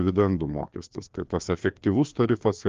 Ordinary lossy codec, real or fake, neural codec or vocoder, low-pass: Opus, 32 kbps; fake; codec, 16 kHz, 2 kbps, FreqCodec, larger model; 7.2 kHz